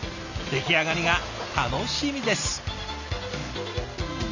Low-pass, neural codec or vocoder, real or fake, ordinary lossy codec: 7.2 kHz; none; real; none